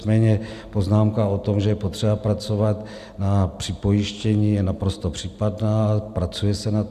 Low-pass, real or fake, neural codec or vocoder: 14.4 kHz; real; none